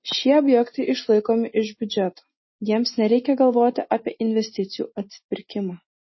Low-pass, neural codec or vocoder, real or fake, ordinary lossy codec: 7.2 kHz; none; real; MP3, 24 kbps